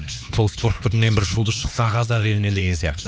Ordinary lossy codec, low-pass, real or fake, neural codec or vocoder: none; none; fake; codec, 16 kHz, 2 kbps, X-Codec, HuBERT features, trained on LibriSpeech